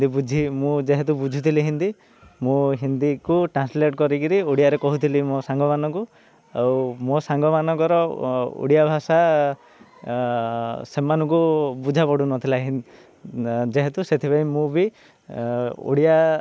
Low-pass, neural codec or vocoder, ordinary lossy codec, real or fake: none; none; none; real